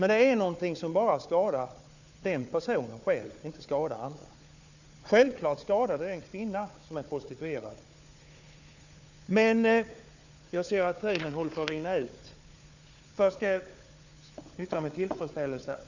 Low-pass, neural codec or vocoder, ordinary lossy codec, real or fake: 7.2 kHz; codec, 16 kHz, 4 kbps, FunCodec, trained on Chinese and English, 50 frames a second; none; fake